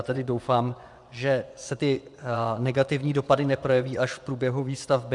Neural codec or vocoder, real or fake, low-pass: vocoder, 24 kHz, 100 mel bands, Vocos; fake; 10.8 kHz